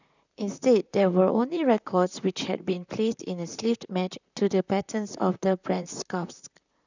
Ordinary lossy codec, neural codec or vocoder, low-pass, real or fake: none; vocoder, 44.1 kHz, 128 mel bands, Pupu-Vocoder; 7.2 kHz; fake